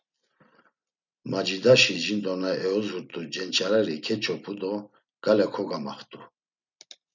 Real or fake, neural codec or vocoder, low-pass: real; none; 7.2 kHz